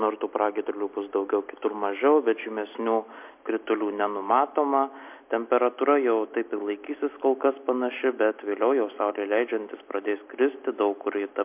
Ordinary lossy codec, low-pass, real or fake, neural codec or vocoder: MP3, 32 kbps; 3.6 kHz; real; none